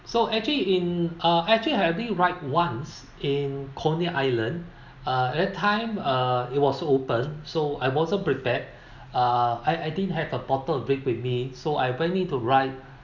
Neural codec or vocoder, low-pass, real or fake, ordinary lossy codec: none; 7.2 kHz; real; none